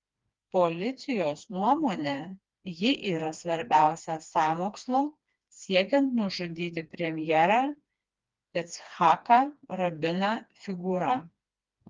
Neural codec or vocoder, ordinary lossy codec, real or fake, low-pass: codec, 16 kHz, 2 kbps, FreqCodec, smaller model; Opus, 32 kbps; fake; 7.2 kHz